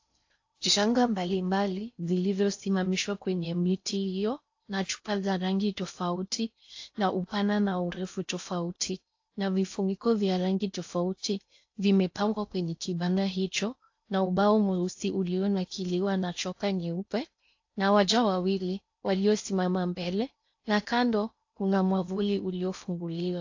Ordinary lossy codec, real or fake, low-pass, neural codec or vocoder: AAC, 48 kbps; fake; 7.2 kHz; codec, 16 kHz in and 24 kHz out, 0.6 kbps, FocalCodec, streaming, 4096 codes